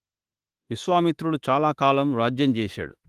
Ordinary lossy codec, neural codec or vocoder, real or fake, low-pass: Opus, 24 kbps; autoencoder, 48 kHz, 32 numbers a frame, DAC-VAE, trained on Japanese speech; fake; 14.4 kHz